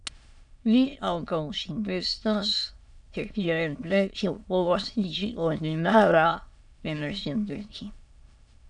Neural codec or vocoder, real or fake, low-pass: autoencoder, 22.05 kHz, a latent of 192 numbers a frame, VITS, trained on many speakers; fake; 9.9 kHz